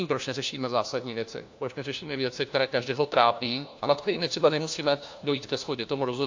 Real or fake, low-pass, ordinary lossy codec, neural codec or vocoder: fake; 7.2 kHz; AAC, 48 kbps; codec, 16 kHz, 1 kbps, FunCodec, trained on LibriTTS, 50 frames a second